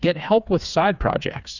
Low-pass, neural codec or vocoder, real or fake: 7.2 kHz; codec, 16 kHz, 4 kbps, FreqCodec, smaller model; fake